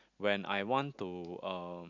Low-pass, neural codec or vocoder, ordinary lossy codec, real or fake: 7.2 kHz; none; none; real